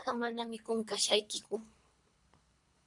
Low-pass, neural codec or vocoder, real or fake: 10.8 kHz; codec, 24 kHz, 3 kbps, HILCodec; fake